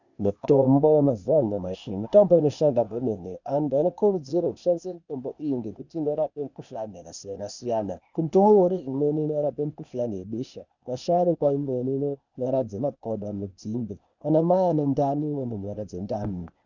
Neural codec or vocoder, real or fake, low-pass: codec, 16 kHz, 0.8 kbps, ZipCodec; fake; 7.2 kHz